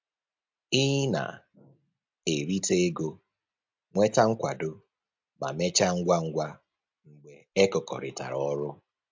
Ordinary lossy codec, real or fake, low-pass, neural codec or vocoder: MP3, 64 kbps; real; 7.2 kHz; none